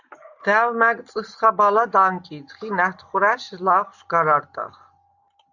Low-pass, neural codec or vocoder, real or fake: 7.2 kHz; none; real